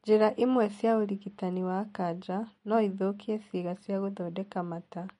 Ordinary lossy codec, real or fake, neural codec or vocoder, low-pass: MP3, 48 kbps; real; none; 10.8 kHz